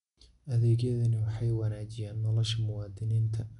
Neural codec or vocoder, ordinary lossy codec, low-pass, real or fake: none; none; 10.8 kHz; real